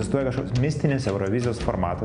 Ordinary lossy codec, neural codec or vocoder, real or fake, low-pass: Opus, 64 kbps; none; real; 9.9 kHz